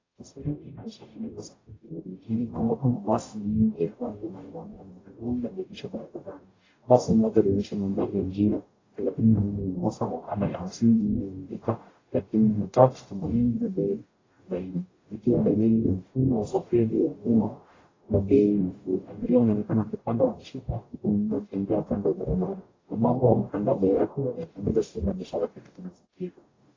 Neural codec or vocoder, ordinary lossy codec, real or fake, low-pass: codec, 44.1 kHz, 0.9 kbps, DAC; AAC, 32 kbps; fake; 7.2 kHz